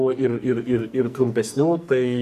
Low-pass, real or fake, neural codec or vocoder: 14.4 kHz; fake; codec, 44.1 kHz, 2.6 kbps, SNAC